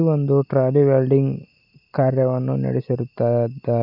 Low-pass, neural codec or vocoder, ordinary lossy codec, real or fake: 5.4 kHz; none; none; real